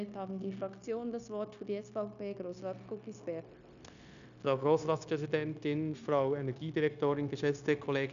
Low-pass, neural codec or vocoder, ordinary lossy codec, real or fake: 7.2 kHz; codec, 16 kHz, 0.9 kbps, LongCat-Audio-Codec; MP3, 96 kbps; fake